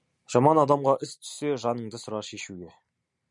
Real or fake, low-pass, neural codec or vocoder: real; 10.8 kHz; none